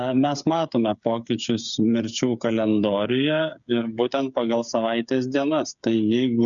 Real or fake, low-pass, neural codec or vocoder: fake; 7.2 kHz; codec, 16 kHz, 8 kbps, FreqCodec, smaller model